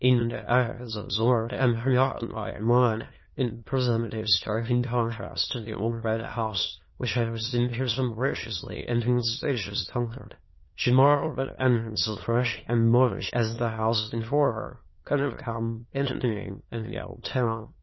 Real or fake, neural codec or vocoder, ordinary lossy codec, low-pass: fake; autoencoder, 22.05 kHz, a latent of 192 numbers a frame, VITS, trained on many speakers; MP3, 24 kbps; 7.2 kHz